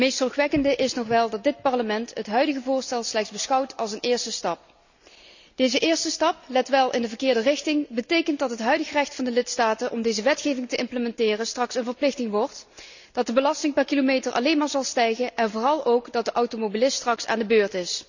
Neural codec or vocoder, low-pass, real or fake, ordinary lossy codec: none; 7.2 kHz; real; none